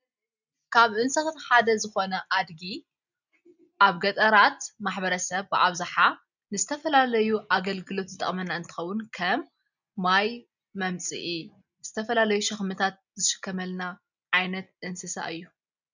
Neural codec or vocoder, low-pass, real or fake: none; 7.2 kHz; real